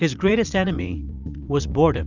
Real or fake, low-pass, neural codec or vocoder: fake; 7.2 kHz; vocoder, 44.1 kHz, 80 mel bands, Vocos